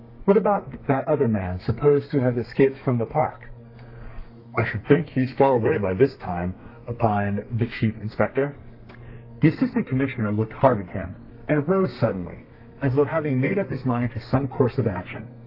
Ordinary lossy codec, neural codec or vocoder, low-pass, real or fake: AAC, 32 kbps; codec, 32 kHz, 1.9 kbps, SNAC; 5.4 kHz; fake